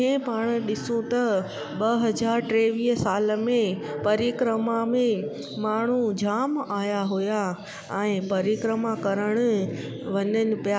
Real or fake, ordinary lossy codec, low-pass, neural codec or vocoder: real; none; none; none